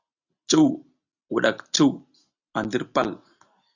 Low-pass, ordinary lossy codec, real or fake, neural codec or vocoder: 7.2 kHz; Opus, 64 kbps; real; none